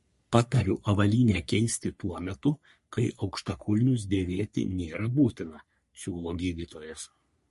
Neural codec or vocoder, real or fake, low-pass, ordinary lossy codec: codec, 44.1 kHz, 3.4 kbps, Pupu-Codec; fake; 14.4 kHz; MP3, 48 kbps